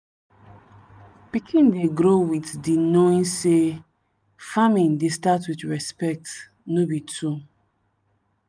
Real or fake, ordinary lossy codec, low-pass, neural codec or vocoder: real; none; none; none